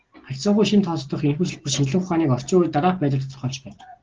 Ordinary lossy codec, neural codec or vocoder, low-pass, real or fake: Opus, 16 kbps; none; 7.2 kHz; real